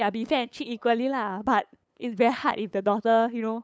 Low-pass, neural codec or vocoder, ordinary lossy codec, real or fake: none; codec, 16 kHz, 8 kbps, FunCodec, trained on LibriTTS, 25 frames a second; none; fake